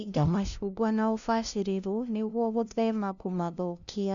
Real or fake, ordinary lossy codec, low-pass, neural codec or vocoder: fake; none; 7.2 kHz; codec, 16 kHz, 0.5 kbps, FunCodec, trained on LibriTTS, 25 frames a second